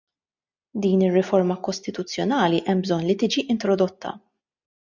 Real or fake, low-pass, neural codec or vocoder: real; 7.2 kHz; none